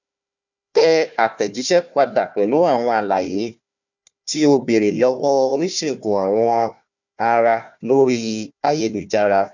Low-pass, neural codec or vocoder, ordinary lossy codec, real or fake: 7.2 kHz; codec, 16 kHz, 1 kbps, FunCodec, trained on Chinese and English, 50 frames a second; none; fake